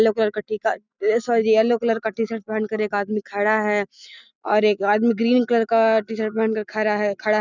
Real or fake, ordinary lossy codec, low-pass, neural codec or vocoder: real; none; 7.2 kHz; none